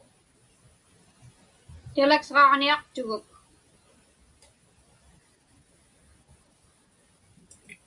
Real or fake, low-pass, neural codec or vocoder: real; 10.8 kHz; none